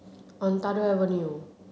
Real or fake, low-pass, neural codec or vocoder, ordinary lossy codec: real; none; none; none